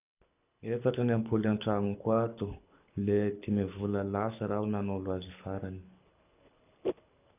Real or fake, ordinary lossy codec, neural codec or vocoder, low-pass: fake; none; codec, 24 kHz, 6 kbps, HILCodec; 3.6 kHz